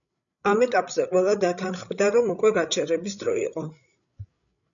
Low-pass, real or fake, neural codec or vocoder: 7.2 kHz; fake; codec, 16 kHz, 16 kbps, FreqCodec, larger model